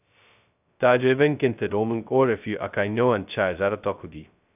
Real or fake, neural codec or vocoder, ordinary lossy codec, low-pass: fake; codec, 16 kHz, 0.2 kbps, FocalCodec; none; 3.6 kHz